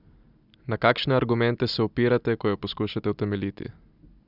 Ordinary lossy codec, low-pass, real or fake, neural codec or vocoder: none; 5.4 kHz; real; none